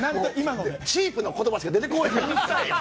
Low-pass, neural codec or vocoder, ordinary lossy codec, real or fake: none; none; none; real